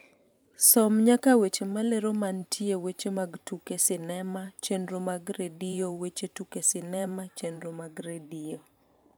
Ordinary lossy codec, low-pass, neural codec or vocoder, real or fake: none; none; vocoder, 44.1 kHz, 128 mel bands every 512 samples, BigVGAN v2; fake